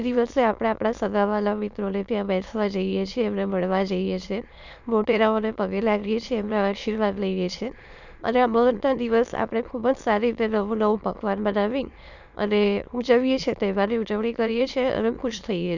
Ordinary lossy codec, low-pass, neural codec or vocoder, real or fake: none; 7.2 kHz; autoencoder, 22.05 kHz, a latent of 192 numbers a frame, VITS, trained on many speakers; fake